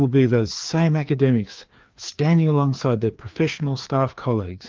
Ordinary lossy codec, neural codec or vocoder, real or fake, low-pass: Opus, 32 kbps; codec, 16 kHz, 2 kbps, FreqCodec, larger model; fake; 7.2 kHz